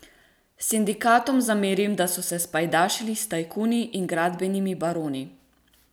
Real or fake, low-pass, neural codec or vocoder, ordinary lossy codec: fake; none; vocoder, 44.1 kHz, 128 mel bands every 256 samples, BigVGAN v2; none